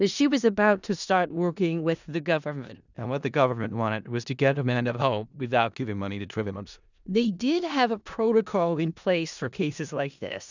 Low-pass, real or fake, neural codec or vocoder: 7.2 kHz; fake; codec, 16 kHz in and 24 kHz out, 0.4 kbps, LongCat-Audio-Codec, four codebook decoder